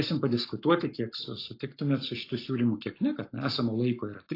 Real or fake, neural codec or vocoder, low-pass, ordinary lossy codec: fake; codec, 44.1 kHz, 7.8 kbps, Pupu-Codec; 5.4 kHz; AAC, 32 kbps